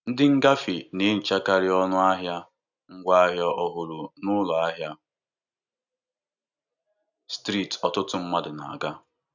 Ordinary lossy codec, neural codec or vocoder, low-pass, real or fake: none; none; 7.2 kHz; real